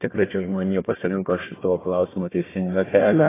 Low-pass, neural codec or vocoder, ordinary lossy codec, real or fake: 3.6 kHz; codec, 16 kHz, 1 kbps, FunCodec, trained on Chinese and English, 50 frames a second; AAC, 16 kbps; fake